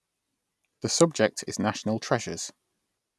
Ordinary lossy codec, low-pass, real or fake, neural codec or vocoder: none; none; real; none